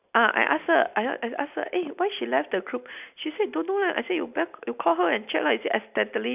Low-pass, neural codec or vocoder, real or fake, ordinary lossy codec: 3.6 kHz; none; real; none